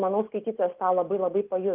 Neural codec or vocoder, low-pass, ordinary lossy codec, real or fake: none; 3.6 kHz; Opus, 24 kbps; real